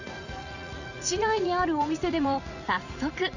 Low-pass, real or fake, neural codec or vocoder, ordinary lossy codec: 7.2 kHz; fake; vocoder, 44.1 kHz, 80 mel bands, Vocos; none